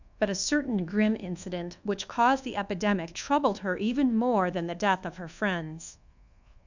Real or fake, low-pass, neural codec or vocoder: fake; 7.2 kHz; codec, 24 kHz, 1.2 kbps, DualCodec